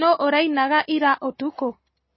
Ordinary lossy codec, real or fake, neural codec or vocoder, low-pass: MP3, 24 kbps; real; none; 7.2 kHz